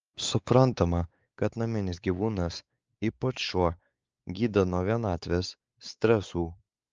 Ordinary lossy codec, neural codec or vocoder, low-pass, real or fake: Opus, 24 kbps; codec, 16 kHz, 4 kbps, X-Codec, WavLM features, trained on Multilingual LibriSpeech; 7.2 kHz; fake